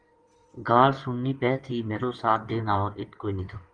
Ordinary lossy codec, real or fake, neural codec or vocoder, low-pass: Opus, 24 kbps; fake; codec, 16 kHz in and 24 kHz out, 2.2 kbps, FireRedTTS-2 codec; 9.9 kHz